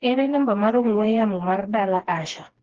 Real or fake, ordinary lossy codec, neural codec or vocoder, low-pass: fake; Opus, 16 kbps; codec, 16 kHz, 2 kbps, FreqCodec, smaller model; 7.2 kHz